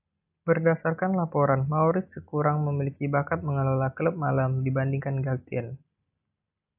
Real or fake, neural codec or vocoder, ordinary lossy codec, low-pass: real; none; AAC, 32 kbps; 3.6 kHz